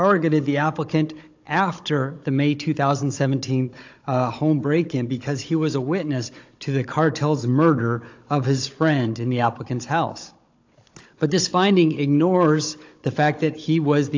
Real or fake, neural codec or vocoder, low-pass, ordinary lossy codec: fake; codec, 16 kHz, 16 kbps, FunCodec, trained on Chinese and English, 50 frames a second; 7.2 kHz; AAC, 48 kbps